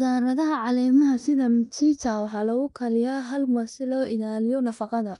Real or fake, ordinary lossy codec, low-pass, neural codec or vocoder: fake; none; 10.8 kHz; codec, 16 kHz in and 24 kHz out, 0.9 kbps, LongCat-Audio-Codec, four codebook decoder